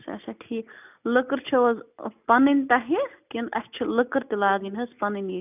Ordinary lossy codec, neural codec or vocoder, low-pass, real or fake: none; none; 3.6 kHz; real